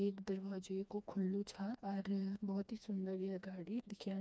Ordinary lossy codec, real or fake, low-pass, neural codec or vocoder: none; fake; none; codec, 16 kHz, 2 kbps, FreqCodec, smaller model